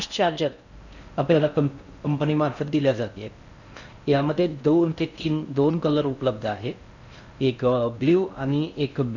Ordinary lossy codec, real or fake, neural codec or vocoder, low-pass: none; fake; codec, 16 kHz in and 24 kHz out, 0.6 kbps, FocalCodec, streaming, 4096 codes; 7.2 kHz